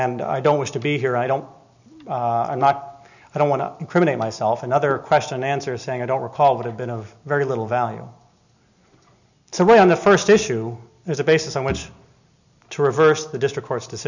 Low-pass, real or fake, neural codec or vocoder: 7.2 kHz; real; none